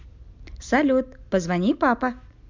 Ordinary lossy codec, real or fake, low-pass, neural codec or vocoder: MP3, 64 kbps; real; 7.2 kHz; none